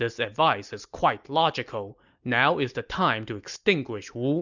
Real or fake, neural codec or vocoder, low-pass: real; none; 7.2 kHz